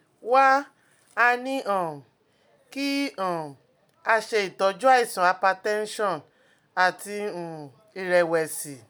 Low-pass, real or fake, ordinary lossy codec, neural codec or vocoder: none; real; none; none